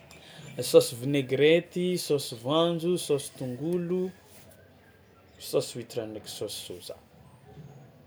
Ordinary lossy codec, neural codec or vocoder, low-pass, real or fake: none; none; none; real